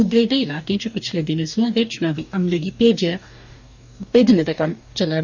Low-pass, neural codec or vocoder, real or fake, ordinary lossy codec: 7.2 kHz; codec, 44.1 kHz, 2.6 kbps, DAC; fake; none